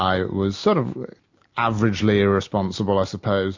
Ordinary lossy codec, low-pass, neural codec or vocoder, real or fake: MP3, 48 kbps; 7.2 kHz; none; real